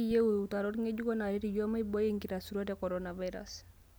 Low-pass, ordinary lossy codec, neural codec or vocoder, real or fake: none; none; none; real